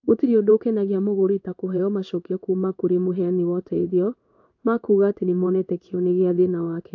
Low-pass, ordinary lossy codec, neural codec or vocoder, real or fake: 7.2 kHz; AAC, 48 kbps; codec, 16 kHz in and 24 kHz out, 1 kbps, XY-Tokenizer; fake